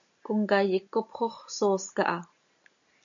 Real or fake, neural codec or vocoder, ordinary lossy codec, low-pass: real; none; MP3, 96 kbps; 7.2 kHz